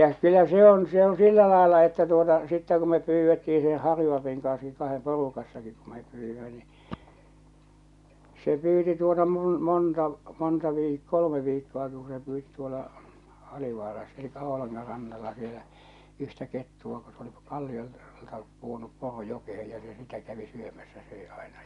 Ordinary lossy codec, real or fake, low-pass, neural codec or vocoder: none; real; 10.8 kHz; none